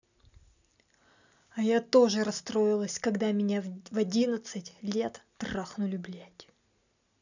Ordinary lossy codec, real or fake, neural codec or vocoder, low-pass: none; real; none; 7.2 kHz